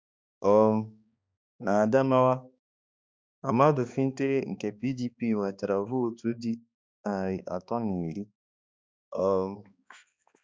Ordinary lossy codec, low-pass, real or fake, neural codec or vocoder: none; none; fake; codec, 16 kHz, 2 kbps, X-Codec, HuBERT features, trained on balanced general audio